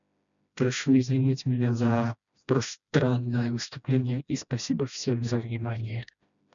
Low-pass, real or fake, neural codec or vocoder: 7.2 kHz; fake; codec, 16 kHz, 1 kbps, FreqCodec, smaller model